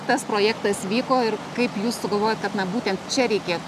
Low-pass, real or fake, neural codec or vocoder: 14.4 kHz; real; none